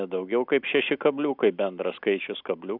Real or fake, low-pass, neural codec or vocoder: real; 5.4 kHz; none